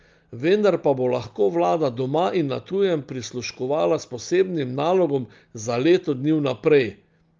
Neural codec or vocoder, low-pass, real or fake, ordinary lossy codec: none; 7.2 kHz; real; Opus, 32 kbps